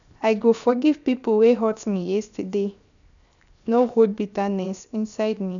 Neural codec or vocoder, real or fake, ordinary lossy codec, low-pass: codec, 16 kHz, 0.7 kbps, FocalCodec; fake; none; 7.2 kHz